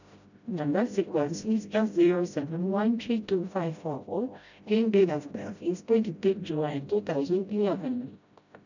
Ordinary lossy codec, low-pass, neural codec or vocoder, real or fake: none; 7.2 kHz; codec, 16 kHz, 0.5 kbps, FreqCodec, smaller model; fake